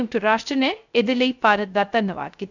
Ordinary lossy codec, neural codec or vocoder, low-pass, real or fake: none; codec, 16 kHz, 0.3 kbps, FocalCodec; 7.2 kHz; fake